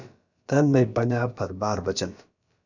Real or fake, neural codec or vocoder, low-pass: fake; codec, 16 kHz, about 1 kbps, DyCAST, with the encoder's durations; 7.2 kHz